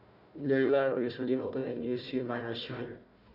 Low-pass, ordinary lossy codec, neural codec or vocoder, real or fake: 5.4 kHz; none; codec, 16 kHz, 1 kbps, FunCodec, trained on Chinese and English, 50 frames a second; fake